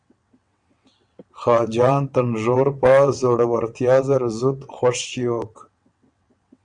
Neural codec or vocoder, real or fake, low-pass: vocoder, 22.05 kHz, 80 mel bands, WaveNeXt; fake; 9.9 kHz